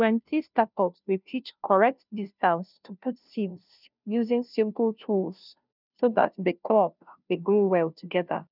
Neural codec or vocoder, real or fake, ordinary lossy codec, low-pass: codec, 16 kHz, 0.5 kbps, FunCodec, trained on Chinese and English, 25 frames a second; fake; none; 5.4 kHz